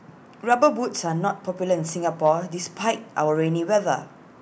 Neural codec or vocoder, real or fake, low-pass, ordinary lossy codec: none; real; none; none